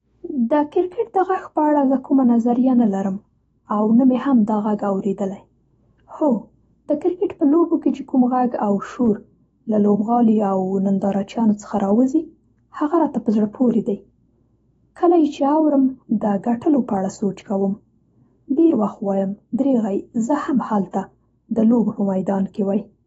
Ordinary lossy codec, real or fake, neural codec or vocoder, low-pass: AAC, 24 kbps; fake; autoencoder, 48 kHz, 128 numbers a frame, DAC-VAE, trained on Japanese speech; 19.8 kHz